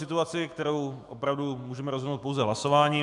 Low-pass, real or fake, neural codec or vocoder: 10.8 kHz; fake; autoencoder, 48 kHz, 128 numbers a frame, DAC-VAE, trained on Japanese speech